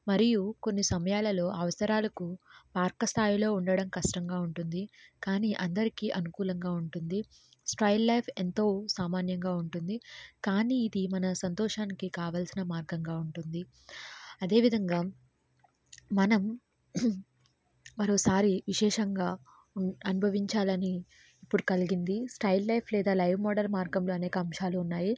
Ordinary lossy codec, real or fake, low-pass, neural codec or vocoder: none; real; none; none